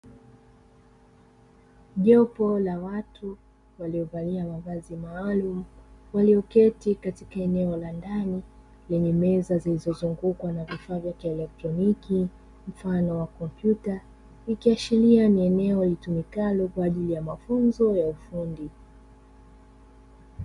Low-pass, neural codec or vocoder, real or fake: 10.8 kHz; none; real